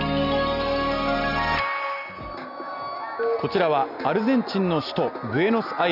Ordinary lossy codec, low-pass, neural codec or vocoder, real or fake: none; 5.4 kHz; none; real